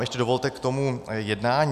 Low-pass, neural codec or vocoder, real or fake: 14.4 kHz; none; real